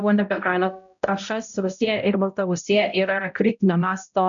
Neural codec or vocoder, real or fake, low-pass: codec, 16 kHz, 0.5 kbps, X-Codec, HuBERT features, trained on balanced general audio; fake; 7.2 kHz